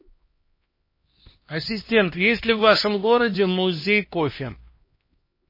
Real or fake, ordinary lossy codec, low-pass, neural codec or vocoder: fake; MP3, 24 kbps; 5.4 kHz; codec, 16 kHz, 1 kbps, X-Codec, HuBERT features, trained on LibriSpeech